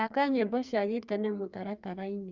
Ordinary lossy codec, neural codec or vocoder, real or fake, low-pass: Opus, 64 kbps; codec, 44.1 kHz, 2.6 kbps, SNAC; fake; 7.2 kHz